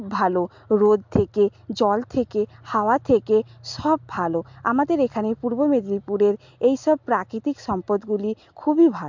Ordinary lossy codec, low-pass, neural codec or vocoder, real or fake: MP3, 64 kbps; 7.2 kHz; none; real